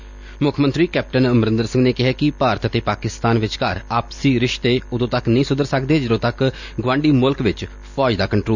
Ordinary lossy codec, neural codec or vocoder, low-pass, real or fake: none; none; 7.2 kHz; real